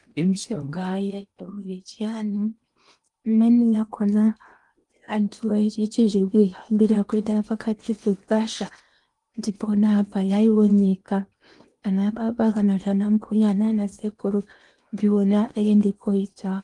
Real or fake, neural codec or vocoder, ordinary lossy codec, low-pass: fake; codec, 16 kHz in and 24 kHz out, 0.8 kbps, FocalCodec, streaming, 65536 codes; Opus, 32 kbps; 10.8 kHz